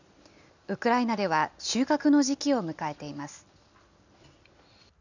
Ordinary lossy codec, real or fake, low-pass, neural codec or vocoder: none; real; 7.2 kHz; none